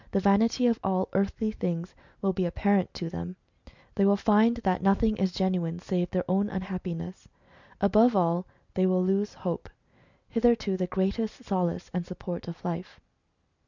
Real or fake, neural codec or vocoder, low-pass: real; none; 7.2 kHz